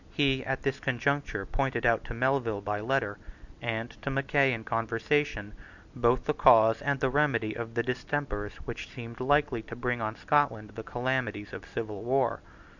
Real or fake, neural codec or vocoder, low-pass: real; none; 7.2 kHz